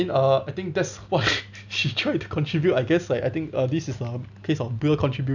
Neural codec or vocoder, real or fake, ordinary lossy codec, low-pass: none; real; none; 7.2 kHz